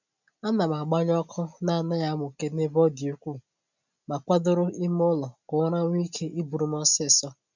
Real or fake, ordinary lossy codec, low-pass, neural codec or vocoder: real; none; 7.2 kHz; none